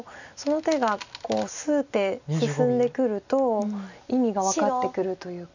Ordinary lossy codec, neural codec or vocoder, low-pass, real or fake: none; none; 7.2 kHz; real